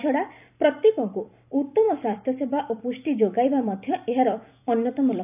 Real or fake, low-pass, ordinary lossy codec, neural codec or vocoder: fake; 3.6 kHz; none; vocoder, 44.1 kHz, 128 mel bands every 256 samples, BigVGAN v2